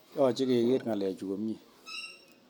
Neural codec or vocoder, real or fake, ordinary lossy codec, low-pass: none; real; none; 19.8 kHz